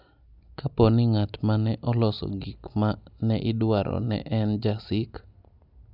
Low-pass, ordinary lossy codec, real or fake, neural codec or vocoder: 5.4 kHz; none; real; none